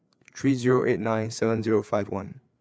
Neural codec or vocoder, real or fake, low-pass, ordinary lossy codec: codec, 16 kHz, 4 kbps, FreqCodec, larger model; fake; none; none